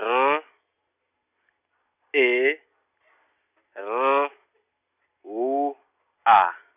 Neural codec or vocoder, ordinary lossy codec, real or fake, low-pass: none; none; real; 3.6 kHz